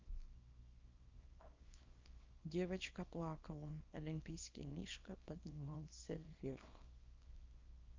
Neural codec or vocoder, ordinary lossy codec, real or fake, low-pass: codec, 24 kHz, 0.9 kbps, WavTokenizer, small release; Opus, 24 kbps; fake; 7.2 kHz